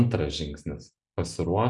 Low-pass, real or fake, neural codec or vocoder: 10.8 kHz; real; none